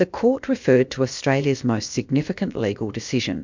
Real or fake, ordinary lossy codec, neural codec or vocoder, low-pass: fake; MP3, 64 kbps; codec, 16 kHz, about 1 kbps, DyCAST, with the encoder's durations; 7.2 kHz